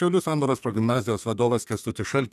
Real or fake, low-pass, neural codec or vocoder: fake; 14.4 kHz; codec, 32 kHz, 1.9 kbps, SNAC